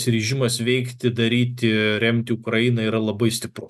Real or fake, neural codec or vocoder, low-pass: real; none; 14.4 kHz